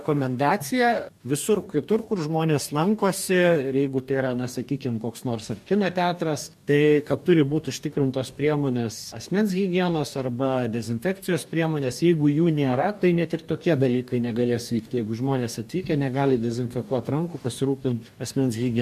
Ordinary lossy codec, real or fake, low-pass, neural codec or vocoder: MP3, 64 kbps; fake; 14.4 kHz; codec, 44.1 kHz, 2.6 kbps, DAC